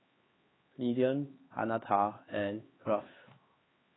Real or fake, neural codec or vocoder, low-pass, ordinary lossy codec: fake; codec, 16 kHz, 4 kbps, X-Codec, HuBERT features, trained on LibriSpeech; 7.2 kHz; AAC, 16 kbps